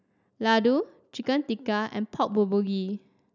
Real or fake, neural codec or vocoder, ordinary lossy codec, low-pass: real; none; none; 7.2 kHz